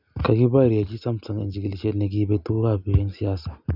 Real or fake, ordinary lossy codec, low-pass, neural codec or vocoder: real; none; 5.4 kHz; none